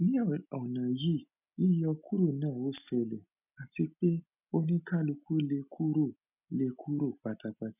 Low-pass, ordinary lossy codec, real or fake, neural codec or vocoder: 3.6 kHz; none; real; none